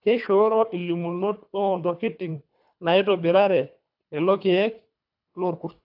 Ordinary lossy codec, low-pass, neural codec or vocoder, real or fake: AAC, 48 kbps; 5.4 kHz; codec, 24 kHz, 3 kbps, HILCodec; fake